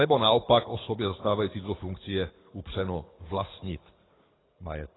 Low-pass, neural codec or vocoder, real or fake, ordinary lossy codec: 7.2 kHz; codec, 24 kHz, 6 kbps, HILCodec; fake; AAC, 16 kbps